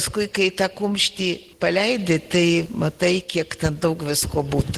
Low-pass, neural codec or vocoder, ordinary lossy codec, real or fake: 14.4 kHz; none; Opus, 16 kbps; real